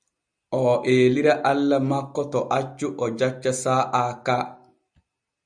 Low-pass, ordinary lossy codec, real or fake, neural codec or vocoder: 9.9 kHz; Opus, 64 kbps; real; none